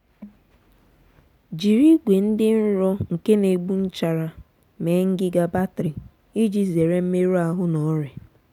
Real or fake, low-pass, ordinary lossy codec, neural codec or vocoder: real; 19.8 kHz; none; none